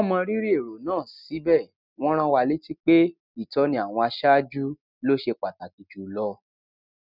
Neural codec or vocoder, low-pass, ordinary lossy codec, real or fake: none; 5.4 kHz; none; real